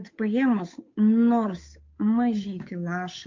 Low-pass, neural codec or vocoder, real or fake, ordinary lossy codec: 7.2 kHz; codec, 16 kHz, 8 kbps, FunCodec, trained on Chinese and English, 25 frames a second; fake; MP3, 48 kbps